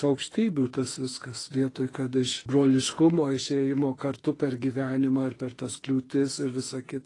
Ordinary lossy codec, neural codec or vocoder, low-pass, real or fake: AAC, 32 kbps; autoencoder, 48 kHz, 32 numbers a frame, DAC-VAE, trained on Japanese speech; 10.8 kHz; fake